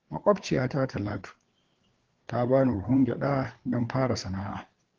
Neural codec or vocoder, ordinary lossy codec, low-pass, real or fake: codec, 16 kHz, 4 kbps, FreqCodec, larger model; Opus, 16 kbps; 7.2 kHz; fake